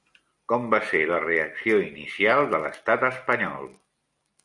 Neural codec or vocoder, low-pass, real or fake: none; 10.8 kHz; real